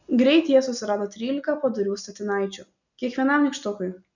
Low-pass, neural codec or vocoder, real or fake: 7.2 kHz; none; real